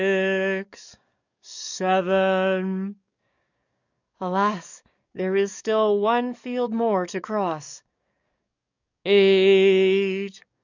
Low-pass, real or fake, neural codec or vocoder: 7.2 kHz; fake; codec, 44.1 kHz, 7.8 kbps, DAC